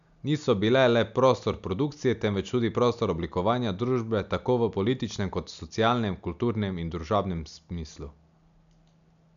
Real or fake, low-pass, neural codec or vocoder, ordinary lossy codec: real; 7.2 kHz; none; none